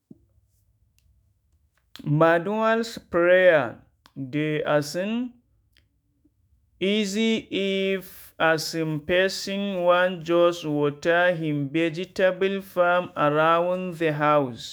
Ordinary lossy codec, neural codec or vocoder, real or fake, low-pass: none; autoencoder, 48 kHz, 128 numbers a frame, DAC-VAE, trained on Japanese speech; fake; none